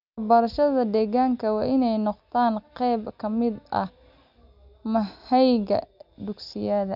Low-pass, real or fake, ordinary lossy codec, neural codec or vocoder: 5.4 kHz; real; none; none